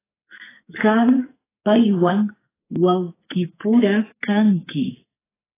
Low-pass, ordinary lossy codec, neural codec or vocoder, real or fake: 3.6 kHz; AAC, 16 kbps; codec, 44.1 kHz, 2.6 kbps, SNAC; fake